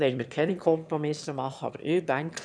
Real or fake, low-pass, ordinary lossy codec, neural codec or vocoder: fake; none; none; autoencoder, 22.05 kHz, a latent of 192 numbers a frame, VITS, trained on one speaker